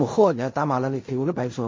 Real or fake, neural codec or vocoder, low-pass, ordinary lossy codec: fake; codec, 16 kHz in and 24 kHz out, 0.4 kbps, LongCat-Audio-Codec, fine tuned four codebook decoder; 7.2 kHz; MP3, 32 kbps